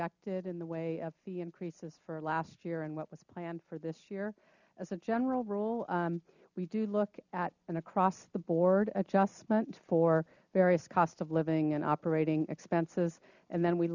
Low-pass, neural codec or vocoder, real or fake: 7.2 kHz; none; real